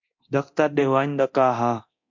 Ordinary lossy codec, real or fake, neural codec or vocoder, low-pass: MP3, 48 kbps; fake; codec, 24 kHz, 0.9 kbps, DualCodec; 7.2 kHz